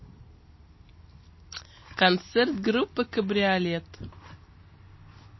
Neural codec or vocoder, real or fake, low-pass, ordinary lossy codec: none; real; 7.2 kHz; MP3, 24 kbps